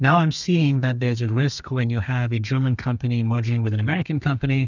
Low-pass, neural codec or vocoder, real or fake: 7.2 kHz; codec, 32 kHz, 1.9 kbps, SNAC; fake